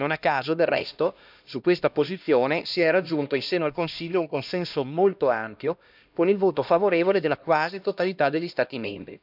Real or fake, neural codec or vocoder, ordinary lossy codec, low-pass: fake; codec, 16 kHz, 1 kbps, X-Codec, HuBERT features, trained on LibriSpeech; none; 5.4 kHz